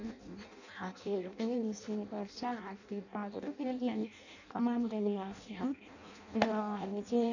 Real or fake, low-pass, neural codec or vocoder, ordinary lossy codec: fake; 7.2 kHz; codec, 16 kHz in and 24 kHz out, 0.6 kbps, FireRedTTS-2 codec; none